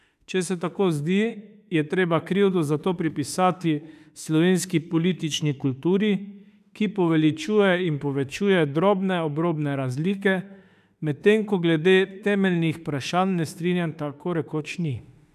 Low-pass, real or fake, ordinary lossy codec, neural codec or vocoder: 14.4 kHz; fake; none; autoencoder, 48 kHz, 32 numbers a frame, DAC-VAE, trained on Japanese speech